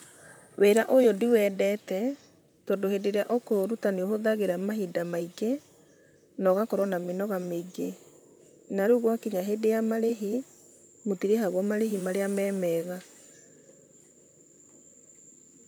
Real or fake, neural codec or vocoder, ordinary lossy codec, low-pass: fake; vocoder, 44.1 kHz, 128 mel bands, Pupu-Vocoder; none; none